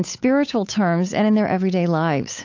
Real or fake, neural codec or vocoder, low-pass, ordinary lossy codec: fake; codec, 16 kHz, 8 kbps, FunCodec, trained on Chinese and English, 25 frames a second; 7.2 kHz; AAC, 48 kbps